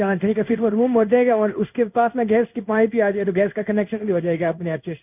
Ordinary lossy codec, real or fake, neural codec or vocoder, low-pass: none; fake; codec, 16 kHz in and 24 kHz out, 1 kbps, XY-Tokenizer; 3.6 kHz